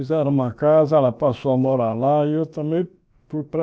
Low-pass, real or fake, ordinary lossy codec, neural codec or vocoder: none; fake; none; codec, 16 kHz, about 1 kbps, DyCAST, with the encoder's durations